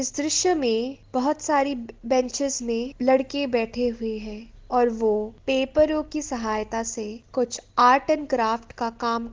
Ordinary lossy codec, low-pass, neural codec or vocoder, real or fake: Opus, 16 kbps; 7.2 kHz; none; real